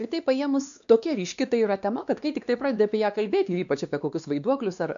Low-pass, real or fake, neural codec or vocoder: 7.2 kHz; fake; codec, 16 kHz, 2 kbps, X-Codec, WavLM features, trained on Multilingual LibriSpeech